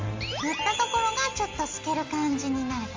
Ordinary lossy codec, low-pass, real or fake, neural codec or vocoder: Opus, 32 kbps; 7.2 kHz; real; none